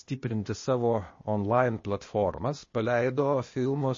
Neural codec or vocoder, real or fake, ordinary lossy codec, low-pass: codec, 16 kHz, 0.8 kbps, ZipCodec; fake; MP3, 32 kbps; 7.2 kHz